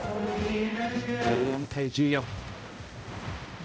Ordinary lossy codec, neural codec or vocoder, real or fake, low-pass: none; codec, 16 kHz, 0.5 kbps, X-Codec, HuBERT features, trained on balanced general audio; fake; none